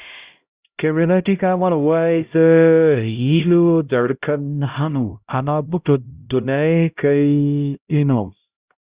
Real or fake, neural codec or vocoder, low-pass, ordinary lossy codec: fake; codec, 16 kHz, 0.5 kbps, X-Codec, HuBERT features, trained on LibriSpeech; 3.6 kHz; Opus, 64 kbps